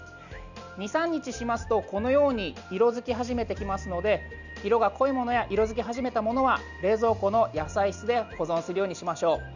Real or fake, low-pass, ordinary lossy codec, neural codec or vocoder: real; 7.2 kHz; none; none